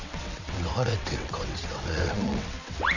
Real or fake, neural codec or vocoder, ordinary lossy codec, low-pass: fake; vocoder, 22.05 kHz, 80 mel bands, WaveNeXt; none; 7.2 kHz